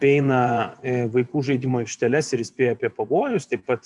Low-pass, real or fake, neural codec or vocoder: 10.8 kHz; fake; vocoder, 24 kHz, 100 mel bands, Vocos